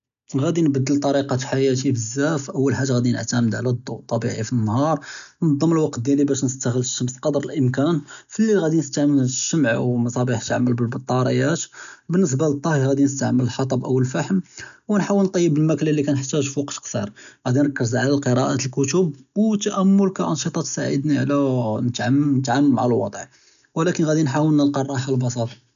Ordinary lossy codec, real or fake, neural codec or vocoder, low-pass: none; real; none; 7.2 kHz